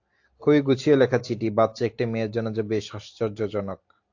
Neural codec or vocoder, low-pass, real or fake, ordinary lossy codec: none; 7.2 kHz; real; AAC, 48 kbps